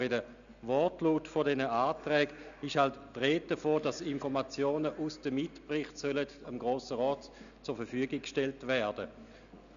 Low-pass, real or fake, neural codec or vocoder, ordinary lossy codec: 7.2 kHz; real; none; none